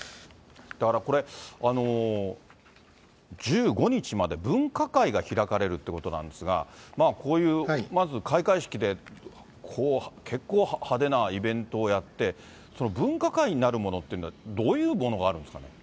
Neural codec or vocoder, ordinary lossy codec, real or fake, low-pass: none; none; real; none